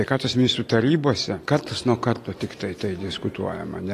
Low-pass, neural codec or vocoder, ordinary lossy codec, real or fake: 14.4 kHz; none; AAC, 48 kbps; real